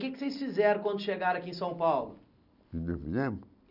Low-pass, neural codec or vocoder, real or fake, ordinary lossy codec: 5.4 kHz; none; real; none